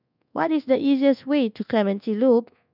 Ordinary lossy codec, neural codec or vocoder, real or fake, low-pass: none; codec, 24 kHz, 1.2 kbps, DualCodec; fake; 5.4 kHz